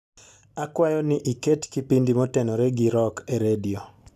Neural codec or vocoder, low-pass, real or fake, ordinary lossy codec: none; 14.4 kHz; real; none